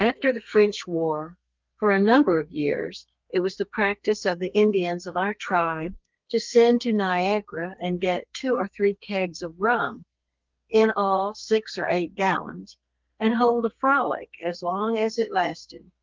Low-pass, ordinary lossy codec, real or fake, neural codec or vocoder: 7.2 kHz; Opus, 24 kbps; fake; codec, 32 kHz, 1.9 kbps, SNAC